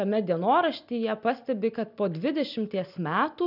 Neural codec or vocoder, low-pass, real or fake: none; 5.4 kHz; real